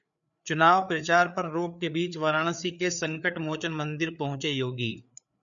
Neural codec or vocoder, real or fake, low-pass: codec, 16 kHz, 4 kbps, FreqCodec, larger model; fake; 7.2 kHz